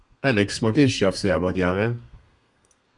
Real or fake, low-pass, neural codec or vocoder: fake; 10.8 kHz; codec, 32 kHz, 1.9 kbps, SNAC